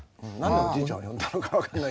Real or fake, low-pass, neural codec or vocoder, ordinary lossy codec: real; none; none; none